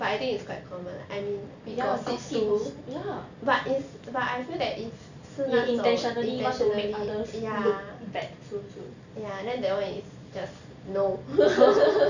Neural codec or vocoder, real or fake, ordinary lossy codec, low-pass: none; real; none; 7.2 kHz